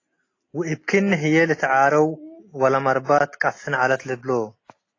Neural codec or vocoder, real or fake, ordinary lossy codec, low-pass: none; real; AAC, 32 kbps; 7.2 kHz